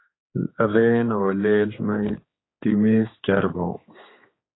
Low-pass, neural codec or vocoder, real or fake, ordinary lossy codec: 7.2 kHz; codec, 16 kHz, 4 kbps, X-Codec, HuBERT features, trained on general audio; fake; AAC, 16 kbps